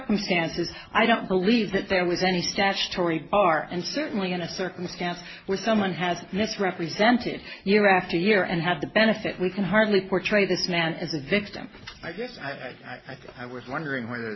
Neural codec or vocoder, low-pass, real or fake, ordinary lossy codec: none; 7.2 kHz; real; MP3, 24 kbps